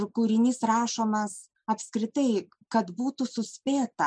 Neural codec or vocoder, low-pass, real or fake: none; 9.9 kHz; real